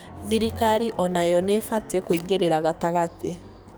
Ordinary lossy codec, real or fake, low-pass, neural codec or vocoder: none; fake; none; codec, 44.1 kHz, 2.6 kbps, SNAC